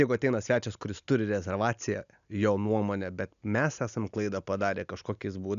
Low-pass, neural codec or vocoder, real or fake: 7.2 kHz; none; real